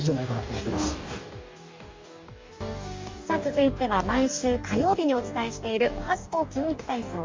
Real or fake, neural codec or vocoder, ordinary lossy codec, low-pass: fake; codec, 44.1 kHz, 2.6 kbps, DAC; MP3, 64 kbps; 7.2 kHz